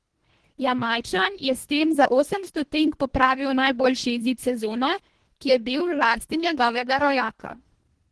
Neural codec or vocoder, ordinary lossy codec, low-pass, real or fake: codec, 24 kHz, 1.5 kbps, HILCodec; Opus, 16 kbps; 10.8 kHz; fake